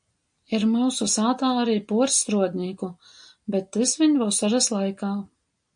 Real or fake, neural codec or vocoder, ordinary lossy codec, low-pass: real; none; MP3, 48 kbps; 9.9 kHz